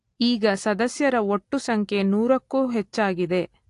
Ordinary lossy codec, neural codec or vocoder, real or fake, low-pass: AAC, 48 kbps; none; real; 10.8 kHz